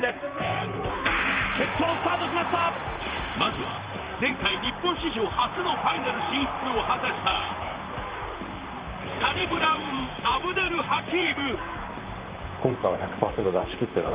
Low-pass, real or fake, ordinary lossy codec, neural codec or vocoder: 3.6 kHz; fake; Opus, 24 kbps; vocoder, 44.1 kHz, 128 mel bands, Pupu-Vocoder